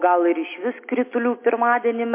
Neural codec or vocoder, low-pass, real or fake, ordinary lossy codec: none; 3.6 kHz; real; MP3, 24 kbps